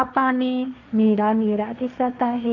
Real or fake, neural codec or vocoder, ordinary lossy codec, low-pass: fake; codec, 16 kHz, 1.1 kbps, Voila-Tokenizer; none; 7.2 kHz